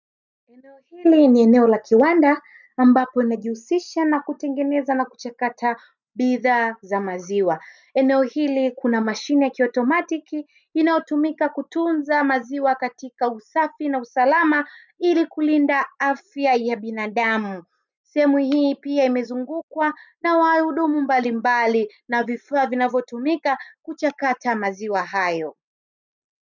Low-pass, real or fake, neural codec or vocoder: 7.2 kHz; real; none